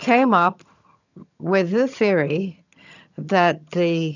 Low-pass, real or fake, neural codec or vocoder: 7.2 kHz; fake; vocoder, 22.05 kHz, 80 mel bands, HiFi-GAN